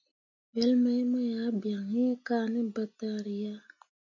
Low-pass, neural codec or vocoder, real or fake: 7.2 kHz; none; real